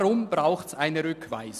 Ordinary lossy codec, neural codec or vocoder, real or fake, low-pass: none; none; real; 14.4 kHz